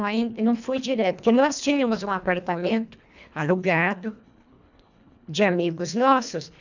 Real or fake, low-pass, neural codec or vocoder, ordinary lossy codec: fake; 7.2 kHz; codec, 24 kHz, 1.5 kbps, HILCodec; none